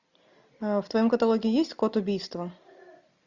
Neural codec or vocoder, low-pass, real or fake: none; 7.2 kHz; real